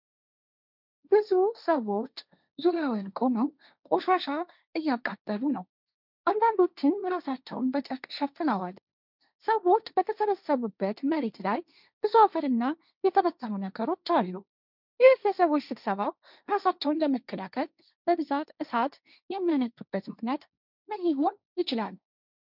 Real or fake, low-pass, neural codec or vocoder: fake; 5.4 kHz; codec, 16 kHz, 1.1 kbps, Voila-Tokenizer